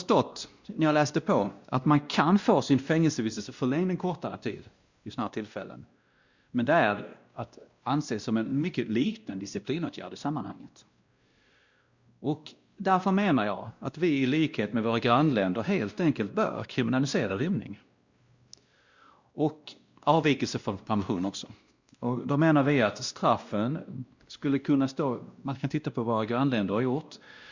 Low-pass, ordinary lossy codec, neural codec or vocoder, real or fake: 7.2 kHz; Opus, 64 kbps; codec, 16 kHz, 1 kbps, X-Codec, WavLM features, trained on Multilingual LibriSpeech; fake